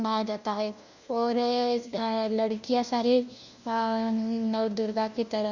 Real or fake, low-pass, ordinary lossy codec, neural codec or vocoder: fake; 7.2 kHz; Opus, 64 kbps; codec, 16 kHz, 1 kbps, FunCodec, trained on LibriTTS, 50 frames a second